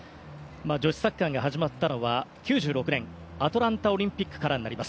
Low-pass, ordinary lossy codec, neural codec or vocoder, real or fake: none; none; none; real